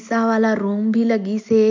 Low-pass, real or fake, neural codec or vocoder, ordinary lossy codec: 7.2 kHz; real; none; MP3, 64 kbps